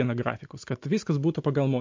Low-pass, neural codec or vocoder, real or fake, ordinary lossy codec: 7.2 kHz; none; real; MP3, 48 kbps